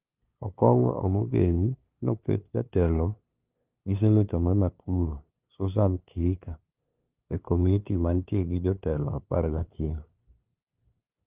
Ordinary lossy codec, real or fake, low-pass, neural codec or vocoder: Opus, 24 kbps; fake; 3.6 kHz; codec, 16 kHz, 2 kbps, FunCodec, trained on LibriTTS, 25 frames a second